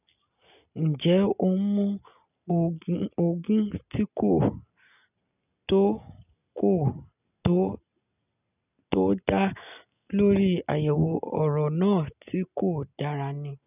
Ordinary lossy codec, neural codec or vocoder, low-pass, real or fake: none; none; 3.6 kHz; real